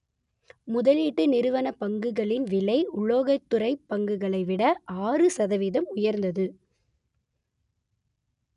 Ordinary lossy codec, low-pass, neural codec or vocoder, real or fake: none; 10.8 kHz; none; real